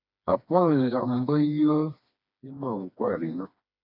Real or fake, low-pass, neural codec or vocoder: fake; 5.4 kHz; codec, 16 kHz, 2 kbps, FreqCodec, smaller model